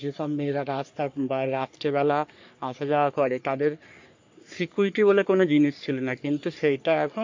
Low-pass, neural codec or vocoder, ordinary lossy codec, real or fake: 7.2 kHz; codec, 44.1 kHz, 3.4 kbps, Pupu-Codec; MP3, 48 kbps; fake